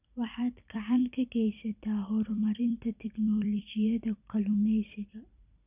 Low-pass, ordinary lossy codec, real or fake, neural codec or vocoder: 3.6 kHz; none; fake; vocoder, 44.1 kHz, 128 mel bands every 256 samples, BigVGAN v2